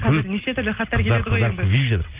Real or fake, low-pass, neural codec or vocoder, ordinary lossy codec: real; 3.6 kHz; none; Opus, 24 kbps